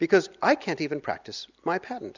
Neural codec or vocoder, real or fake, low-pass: none; real; 7.2 kHz